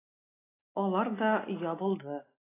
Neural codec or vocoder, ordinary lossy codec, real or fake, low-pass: none; AAC, 16 kbps; real; 3.6 kHz